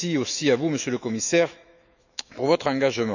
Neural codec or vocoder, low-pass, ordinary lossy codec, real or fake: autoencoder, 48 kHz, 128 numbers a frame, DAC-VAE, trained on Japanese speech; 7.2 kHz; none; fake